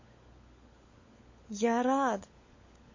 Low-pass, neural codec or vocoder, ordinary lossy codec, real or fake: 7.2 kHz; codec, 16 kHz, 16 kbps, FunCodec, trained on LibriTTS, 50 frames a second; MP3, 32 kbps; fake